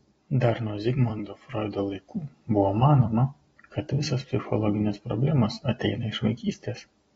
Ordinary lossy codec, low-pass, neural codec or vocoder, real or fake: AAC, 24 kbps; 19.8 kHz; vocoder, 44.1 kHz, 128 mel bands every 256 samples, BigVGAN v2; fake